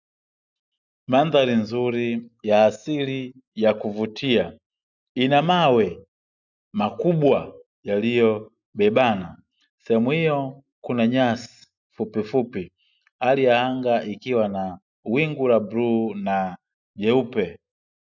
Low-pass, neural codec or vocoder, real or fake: 7.2 kHz; none; real